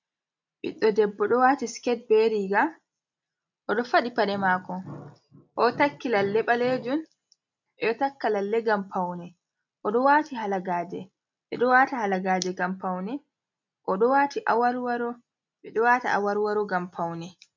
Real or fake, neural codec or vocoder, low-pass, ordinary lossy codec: real; none; 7.2 kHz; MP3, 64 kbps